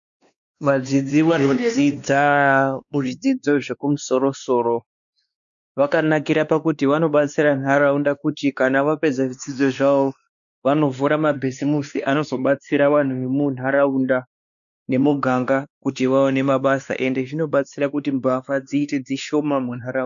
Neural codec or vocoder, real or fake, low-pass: codec, 16 kHz, 2 kbps, X-Codec, WavLM features, trained on Multilingual LibriSpeech; fake; 7.2 kHz